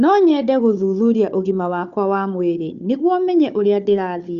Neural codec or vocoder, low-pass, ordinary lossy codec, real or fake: codec, 16 kHz, 4 kbps, FunCodec, trained on Chinese and English, 50 frames a second; 7.2 kHz; Opus, 64 kbps; fake